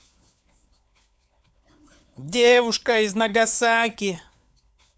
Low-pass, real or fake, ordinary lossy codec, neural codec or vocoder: none; fake; none; codec, 16 kHz, 4 kbps, FunCodec, trained on LibriTTS, 50 frames a second